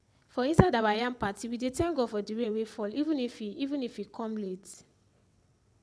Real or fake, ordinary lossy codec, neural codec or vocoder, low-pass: fake; none; vocoder, 22.05 kHz, 80 mel bands, WaveNeXt; none